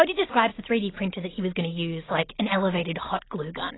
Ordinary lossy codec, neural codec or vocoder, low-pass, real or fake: AAC, 16 kbps; none; 7.2 kHz; real